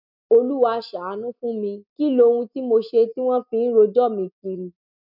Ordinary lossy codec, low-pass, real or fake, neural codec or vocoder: none; 5.4 kHz; real; none